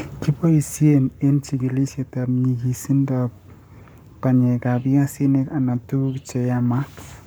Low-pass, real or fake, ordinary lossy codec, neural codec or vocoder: none; fake; none; codec, 44.1 kHz, 7.8 kbps, Pupu-Codec